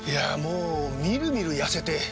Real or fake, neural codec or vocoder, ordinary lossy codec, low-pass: real; none; none; none